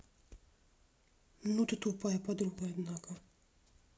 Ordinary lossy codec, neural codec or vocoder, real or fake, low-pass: none; none; real; none